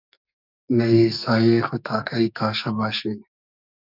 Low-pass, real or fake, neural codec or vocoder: 5.4 kHz; fake; codec, 44.1 kHz, 2.6 kbps, SNAC